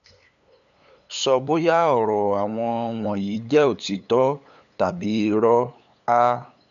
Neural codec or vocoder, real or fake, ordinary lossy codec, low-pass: codec, 16 kHz, 8 kbps, FunCodec, trained on LibriTTS, 25 frames a second; fake; none; 7.2 kHz